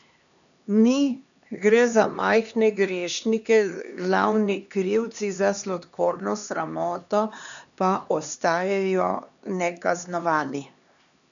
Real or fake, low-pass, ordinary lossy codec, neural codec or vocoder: fake; 7.2 kHz; none; codec, 16 kHz, 2 kbps, X-Codec, HuBERT features, trained on LibriSpeech